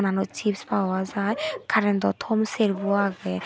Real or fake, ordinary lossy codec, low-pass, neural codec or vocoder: real; none; none; none